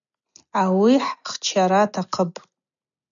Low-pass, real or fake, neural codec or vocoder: 7.2 kHz; real; none